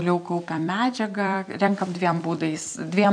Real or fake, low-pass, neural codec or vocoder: fake; 9.9 kHz; vocoder, 44.1 kHz, 128 mel bands every 256 samples, BigVGAN v2